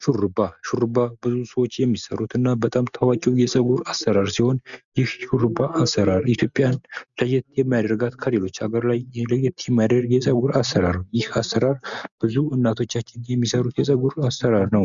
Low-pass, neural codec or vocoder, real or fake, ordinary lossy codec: 7.2 kHz; none; real; MP3, 96 kbps